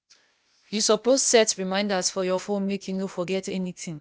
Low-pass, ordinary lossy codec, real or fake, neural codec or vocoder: none; none; fake; codec, 16 kHz, 0.8 kbps, ZipCodec